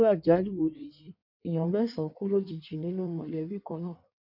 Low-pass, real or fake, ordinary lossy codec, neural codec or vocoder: 5.4 kHz; fake; none; codec, 16 kHz in and 24 kHz out, 1.1 kbps, FireRedTTS-2 codec